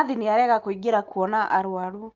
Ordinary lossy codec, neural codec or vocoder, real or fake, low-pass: Opus, 24 kbps; none; real; 7.2 kHz